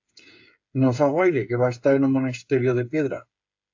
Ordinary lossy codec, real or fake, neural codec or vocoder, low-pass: AAC, 48 kbps; fake; codec, 16 kHz, 8 kbps, FreqCodec, smaller model; 7.2 kHz